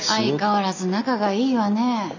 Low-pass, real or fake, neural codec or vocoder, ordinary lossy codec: 7.2 kHz; real; none; none